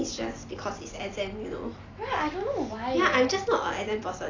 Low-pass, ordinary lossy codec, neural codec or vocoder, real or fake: 7.2 kHz; AAC, 32 kbps; none; real